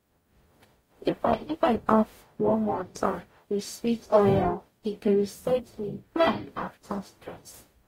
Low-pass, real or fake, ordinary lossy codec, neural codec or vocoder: 19.8 kHz; fake; AAC, 48 kbps; codec, 44.1 kHz, 0.9 kbps, DAC